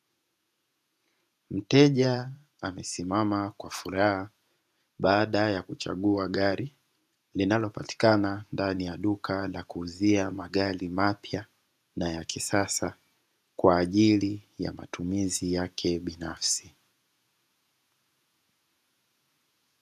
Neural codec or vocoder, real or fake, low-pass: vocoder, 48 kHz, 128 mel bands, Vocos; fake; 14.4 kHz